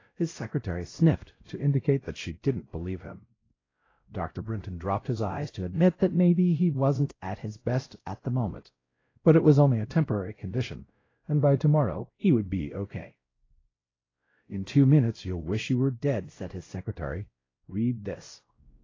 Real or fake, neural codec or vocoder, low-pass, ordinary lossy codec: fake; codec, 16 kHz, 0.5 kbps, X-Codec, WavLM features, trained on Multilingual LibriSpeech; 7.2 kHz; AAC, 32 kbps